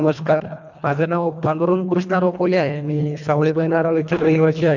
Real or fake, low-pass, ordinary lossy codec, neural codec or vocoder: fake; 7.2 kHz; none; codec, 24 kHz, 1.5 kbps, HILCodec